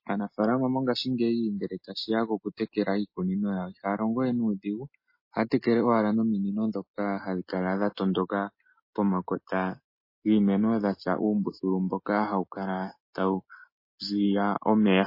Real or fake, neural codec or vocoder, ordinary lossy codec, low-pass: real; none; MP3, 24 kbps; 5.4 kHz